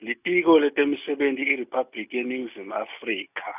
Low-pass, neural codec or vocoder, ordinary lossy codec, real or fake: 3.6 kHz; none; none; real